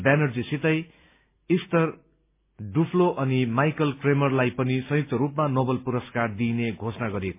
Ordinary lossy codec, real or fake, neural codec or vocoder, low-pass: MP3, 24 kbps; real; none; 3.6 kHz